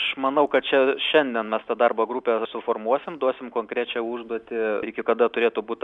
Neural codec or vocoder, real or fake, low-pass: none; real; 10.8 kHz